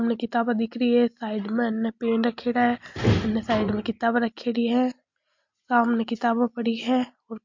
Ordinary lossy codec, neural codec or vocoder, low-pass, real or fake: MP3, 48 kbps; none; 7.2 kHz; real